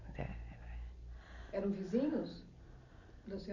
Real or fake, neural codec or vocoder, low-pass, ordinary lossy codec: real; none; 7.2 kHz; none